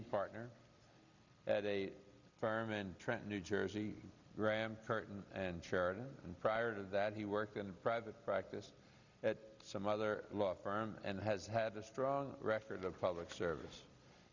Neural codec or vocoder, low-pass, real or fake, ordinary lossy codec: none; 7.2 kHz; real; Opus, 64 kbps